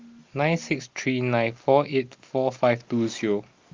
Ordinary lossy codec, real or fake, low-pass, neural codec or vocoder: Opus, 32 kbps; real; 7.2 kHz; none